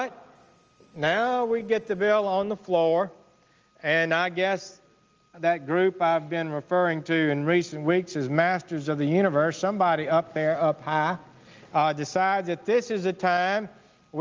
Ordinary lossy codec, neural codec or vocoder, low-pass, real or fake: Opus, 24 kbps; none; 7.2 kHz; real